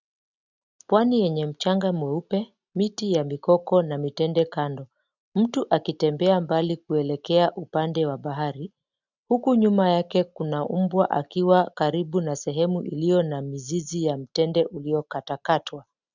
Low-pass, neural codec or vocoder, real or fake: 7.2 kHz; none; real